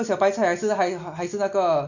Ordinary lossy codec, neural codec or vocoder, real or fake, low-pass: none; none; real; 7.2 kHz